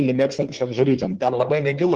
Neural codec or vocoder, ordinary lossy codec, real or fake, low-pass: codec, 24 kHz, 1 kbps, SNAC; Opus, 16 kbps; fake; 10.8 kHz